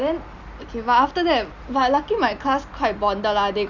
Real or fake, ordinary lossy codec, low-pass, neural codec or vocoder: real; none; 7.2 kHz; none